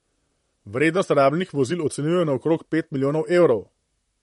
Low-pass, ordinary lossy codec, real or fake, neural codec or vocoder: 19.8 kHz; MP3, 48 kbps; fake; vocoder, 44.1 kHz, 128 mel bands, Pupu-Vocoder